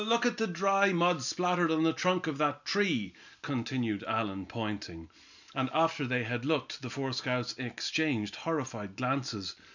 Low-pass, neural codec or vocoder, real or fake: 7.2 kHz; none; real